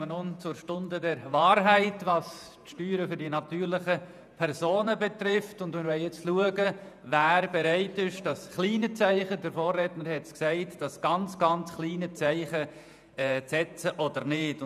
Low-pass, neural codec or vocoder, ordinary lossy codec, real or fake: 14.4 kHz; vocoder, 48 kHz, 128 mel bands, Vocos; none; fake